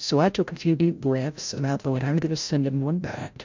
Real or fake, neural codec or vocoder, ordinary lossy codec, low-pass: fake; codec, 16 kHz, 0.5 kbps, FreqCodec, larger model; MP3, 64 kbps; 7.2 kHz